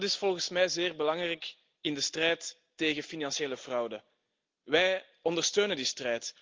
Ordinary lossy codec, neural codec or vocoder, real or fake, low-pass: Opus, 32 kbps; none; real; 7.2 kHz